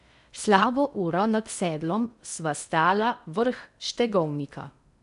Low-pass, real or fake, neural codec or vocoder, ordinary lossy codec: 10.8 kHz; fake; codec, 16 kHz in and 24 kHz out, 0.8 kbps, FocalCodec, streaming, 65536 codes; none